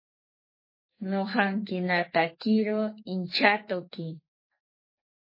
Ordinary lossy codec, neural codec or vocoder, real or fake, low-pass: MP3, 24 kbps; codec, 44.1 kHz, 2.6 kbps, SNAC; fake; 5.4 kHz